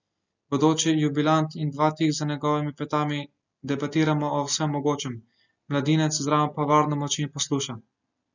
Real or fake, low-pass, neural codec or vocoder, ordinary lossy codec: real; 7.2 kHz; none; none